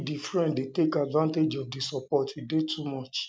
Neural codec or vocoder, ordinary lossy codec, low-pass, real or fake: none; none; none; real